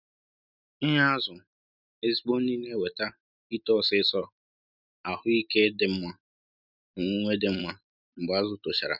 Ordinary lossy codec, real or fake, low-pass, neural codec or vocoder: none; real; 5.4 kHz; none